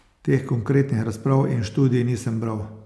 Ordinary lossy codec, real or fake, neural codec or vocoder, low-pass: none; real; none; none